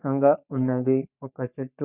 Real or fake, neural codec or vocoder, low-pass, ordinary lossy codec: fake; codec, 44.1 kHz, 3.4 kbps, Pupu-Codec; 3.6 kHz; none